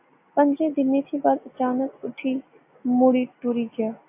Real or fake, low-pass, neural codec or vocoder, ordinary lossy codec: real; 3.6 kHz; none; AAC, 24 kbps